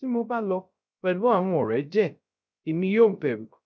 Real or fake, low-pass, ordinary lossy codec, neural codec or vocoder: fake; none; none; codec, 16 kHz, 0.3 kbps, FocalCodec